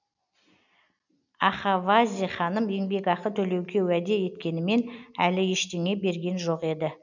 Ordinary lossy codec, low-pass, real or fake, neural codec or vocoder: none; 7.2 kHz; real; none